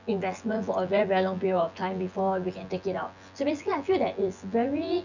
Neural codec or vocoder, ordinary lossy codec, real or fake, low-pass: vocoder, 24 kHz, 100 mel bands, Vocos; none; fake; 7.2 kHz